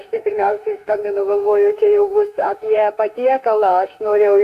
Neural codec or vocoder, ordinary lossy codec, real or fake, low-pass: autoencoder, 48 kHz, 32 numbers a frame, DAC-VAE, trained on Japanese speech; AAC, 64 kbps; fake; 14.4 kHz